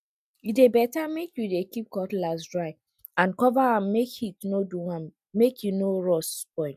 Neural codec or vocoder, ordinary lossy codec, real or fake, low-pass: none; none; real; 14.4 kHz